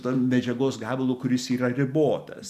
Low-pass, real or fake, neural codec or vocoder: 14.4 kHz; real; none